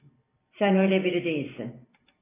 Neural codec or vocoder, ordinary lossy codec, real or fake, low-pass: vocoder, 44.1 kHz, 128 mel bands every 512 samples, BigVGAN v2; AAC, 16 kbps; fake; 3.6 kHz